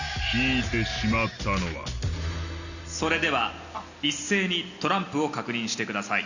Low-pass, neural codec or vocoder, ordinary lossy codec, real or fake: 7.2 kHz; none; none; real